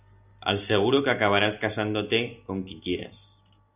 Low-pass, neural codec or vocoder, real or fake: 3.6 kHz; none; real